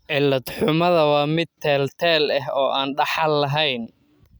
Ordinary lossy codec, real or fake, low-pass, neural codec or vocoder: none; real; none; none